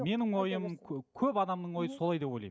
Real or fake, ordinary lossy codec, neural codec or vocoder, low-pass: real; none; none; none